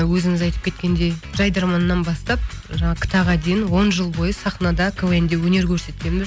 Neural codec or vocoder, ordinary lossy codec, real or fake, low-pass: none; none; real; none